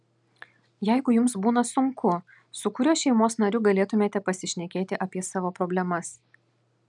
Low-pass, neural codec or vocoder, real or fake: 10.8 kHz; none; real